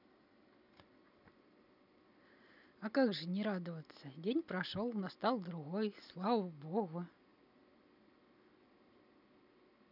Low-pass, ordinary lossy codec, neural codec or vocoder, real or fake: 5.4 kHz; none; none; real